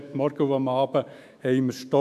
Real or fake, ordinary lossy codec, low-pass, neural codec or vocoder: fake; none; 14.4 kHz; autoencoder, 48 kHz, 128 numbers a frame, DAC-VAE, trained on Japanese speech